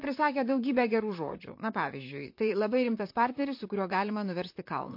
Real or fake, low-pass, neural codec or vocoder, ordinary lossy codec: fake; 5.4 kHz; vocoder, 44.1 kHz, 128 mel bands, Pupu-Vocoder; MP3, 32 kbps